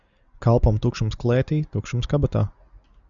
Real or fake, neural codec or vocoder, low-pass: real; none; 7.2 kHz